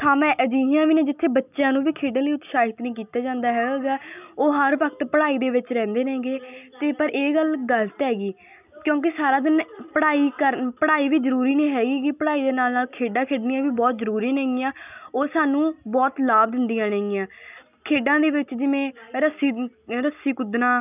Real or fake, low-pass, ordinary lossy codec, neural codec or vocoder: real; 3.6 kHz; none; none